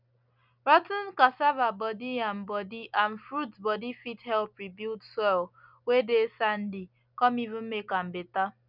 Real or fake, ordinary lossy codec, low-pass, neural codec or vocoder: real; none; 5.4 kHz; none